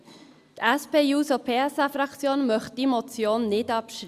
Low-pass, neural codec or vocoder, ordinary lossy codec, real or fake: 14.4 kHz; none; Opus, 64 kbps; real